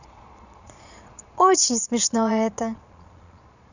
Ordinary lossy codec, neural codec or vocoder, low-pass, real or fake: none; vocoder, 22.05 kHz, 80 mel bands, Vocos; 7.2 kHz; fake